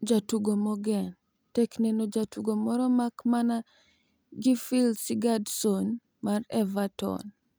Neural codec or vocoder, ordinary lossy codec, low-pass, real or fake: none; none; none; real